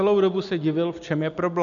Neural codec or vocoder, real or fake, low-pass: none; real; 7.2 kHz